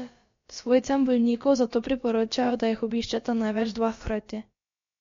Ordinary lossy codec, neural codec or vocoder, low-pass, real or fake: MP3, 48 kbps; codec, 16 kHz, about 1 kbps, DyCAST, with the encoder's durations; 7.2 kHz; fake